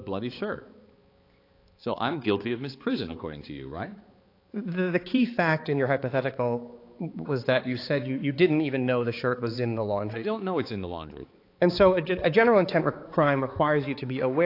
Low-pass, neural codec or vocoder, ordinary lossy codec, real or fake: 5.4 kHz; codec, 16 kHz, 4 kbps, X-Codec, HuBERT features, trained on balanced general audio; AAC, 32 kbps; fake